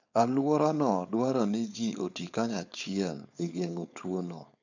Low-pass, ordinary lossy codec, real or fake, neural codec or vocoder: 7.2 kHz; none; fake; codec, 16 kHz, 4.8 kbps, FACodec